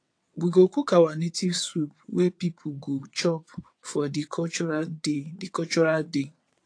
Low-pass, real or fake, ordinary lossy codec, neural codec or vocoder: 9.9 kHz; fake; AAC, 48 kbps; vocoder, 22.05 kHz, 80 mel bands, WaveNeXt